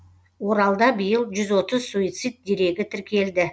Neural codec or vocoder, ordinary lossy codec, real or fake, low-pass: none; none; real; none